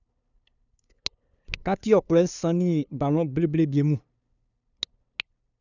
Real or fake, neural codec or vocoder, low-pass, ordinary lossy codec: fake; codec, 16 kHz, 2 kbps, FunCodec, trained on LibriTTS, 25 frames a second; 7.2 kHz; none